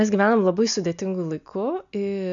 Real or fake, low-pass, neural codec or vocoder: real; 7.2 kHz; none